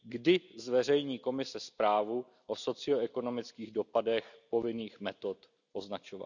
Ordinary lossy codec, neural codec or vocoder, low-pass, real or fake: none; none; 7.2 kHz; real